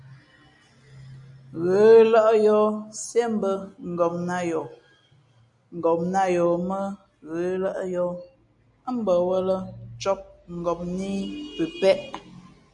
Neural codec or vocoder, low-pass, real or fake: none; 10.8 kHz; real